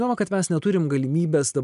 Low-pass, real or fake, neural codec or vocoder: 10.8 kHz; real; none